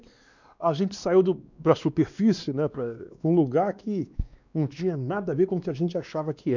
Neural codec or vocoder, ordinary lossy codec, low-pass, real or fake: codec, 16 kHz, 2 kbps, X-Codec, WavLM features, trained on Multilingual LibriSpeech; none; 7.2 kHz; fake